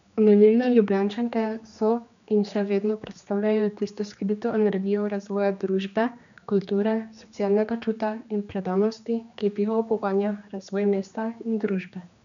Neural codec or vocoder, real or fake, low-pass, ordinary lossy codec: codec, 16 kHz, 2 kbps, X-Codec, HuBERT features, trained on general audio; fake; 7.2 kHz; none